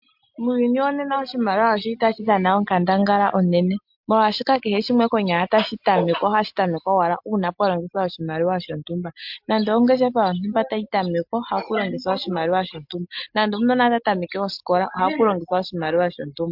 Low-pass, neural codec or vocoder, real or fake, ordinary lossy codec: 5.4 kHz; none; real; MP3, 48 kbps